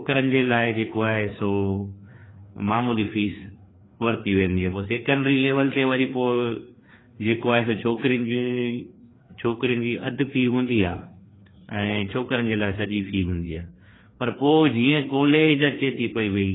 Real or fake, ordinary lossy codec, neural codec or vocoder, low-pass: fake; AAC, 16 kbps; codec, 16 kHz, 2 kbps, FreqCodec, larger model; 7.2 kHz